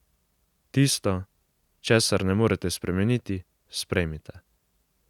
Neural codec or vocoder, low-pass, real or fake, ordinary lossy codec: none; 19.8 kHz; real; none